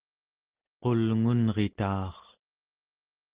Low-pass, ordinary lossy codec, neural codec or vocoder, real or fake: 3.6 kHz; Opus, 16 kbps; none; real